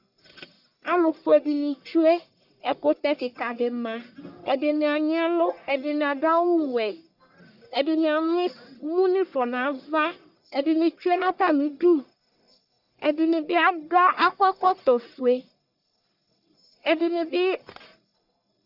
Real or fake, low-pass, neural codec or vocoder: fake; 5.4 kHz; codec, 44.1 kHz, 1.7 kbps, Pupu-Codec